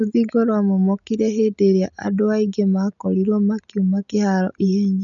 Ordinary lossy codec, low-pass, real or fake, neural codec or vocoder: none; 7.2 kHz; real; none